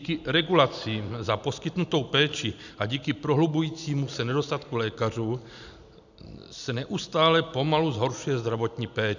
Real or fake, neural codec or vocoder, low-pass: real; none; 7.2 kHz